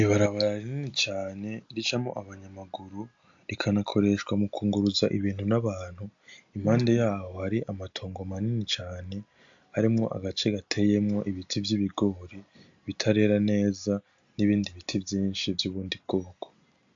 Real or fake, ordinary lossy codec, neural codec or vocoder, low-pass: real; AAC, 64 kbps; none; 7.2 kHz